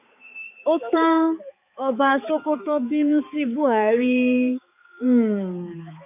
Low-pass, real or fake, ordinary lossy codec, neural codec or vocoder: 3.6 kHz; fake; none; codec, 16 kHz, 4 kbps, X-Codec, HuBERT features, trained on balanced general audio